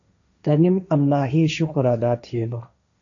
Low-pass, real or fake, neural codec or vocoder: 7.2 kHz; fake; codec, 16 kHz, 1.1 kbps, Voila-Tokenizer